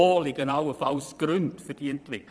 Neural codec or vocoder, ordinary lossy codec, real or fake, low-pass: vocoder, 22.05 kHz, 80 mel bands, Vocos; none; fake; none